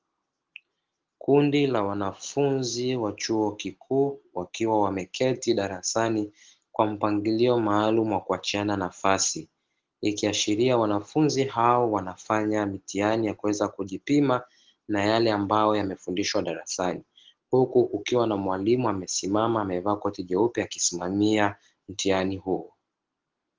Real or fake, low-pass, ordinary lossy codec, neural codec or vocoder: real; 7.2 kHz; Opus, 16 kbps; none